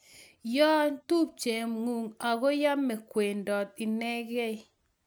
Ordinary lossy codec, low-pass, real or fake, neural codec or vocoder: none; none; real; none